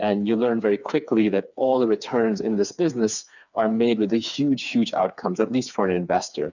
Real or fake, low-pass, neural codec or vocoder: fake; 7.2 kHz; codec, 16 kHz, 4 kbps, FreqCodec, smaller model